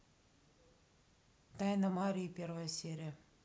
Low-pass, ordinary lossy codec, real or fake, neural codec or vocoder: none; none; real; none